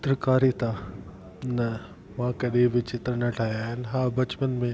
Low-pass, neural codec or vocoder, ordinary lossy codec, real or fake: none; none; none; real